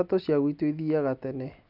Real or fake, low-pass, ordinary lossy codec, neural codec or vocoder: real; 5.4 kHz; none; none